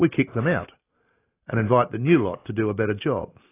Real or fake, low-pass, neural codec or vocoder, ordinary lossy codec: real; 3.6 kHz; none; AAC, 24 kbps